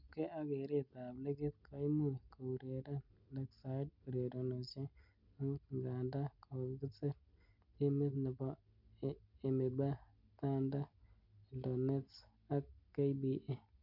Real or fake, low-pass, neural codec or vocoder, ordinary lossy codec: real; 5.4 kHz; none; none